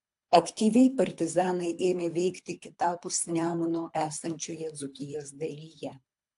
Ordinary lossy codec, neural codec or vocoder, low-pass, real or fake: AAC, 64 kbps; codec, 24 kHz, 3 kbps, HILCodec; 10.8 kHz; fake